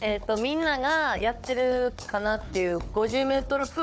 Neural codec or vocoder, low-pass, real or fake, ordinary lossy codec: codec, 16 kHz, 4 kbps, FunCodec, trained on Chinese and English, 50 frames a second; none; fake; none